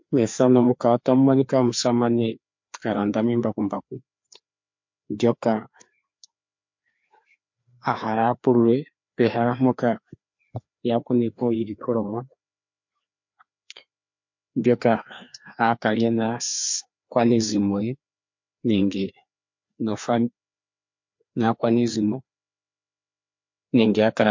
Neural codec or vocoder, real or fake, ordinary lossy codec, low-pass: codec, 16 kHz, 2 kbps, FreqCodec, larger model; fake; MP3, 48 kbps; 7.2 kHz